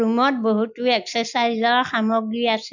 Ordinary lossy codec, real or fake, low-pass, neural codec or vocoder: none; real; 7.2 kHz; none